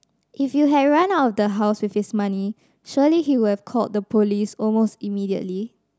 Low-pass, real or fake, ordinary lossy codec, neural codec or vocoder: none; real; none; none